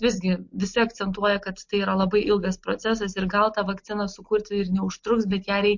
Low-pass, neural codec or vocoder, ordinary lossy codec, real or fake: 7.2 kHz; none; MP3, 48 kbps; real